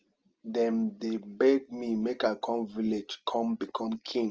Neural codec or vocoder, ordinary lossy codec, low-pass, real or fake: none; Opus, 24 kbps; 7.2 kHz; real